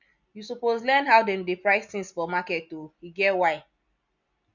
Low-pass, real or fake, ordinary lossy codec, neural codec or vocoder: 7.2 kHz; real; none; none